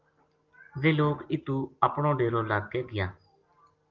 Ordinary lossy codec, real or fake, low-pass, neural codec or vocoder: Opus, 32 kbps; real; 7.2 kHz; none